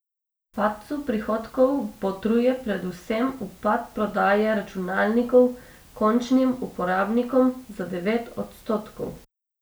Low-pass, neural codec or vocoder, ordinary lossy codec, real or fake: none; none; none; real